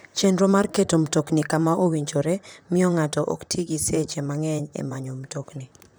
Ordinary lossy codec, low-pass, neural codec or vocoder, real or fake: none; none; vocoder, 44.1 kHz, 128 mel bands, Pupu-Vocoder; fake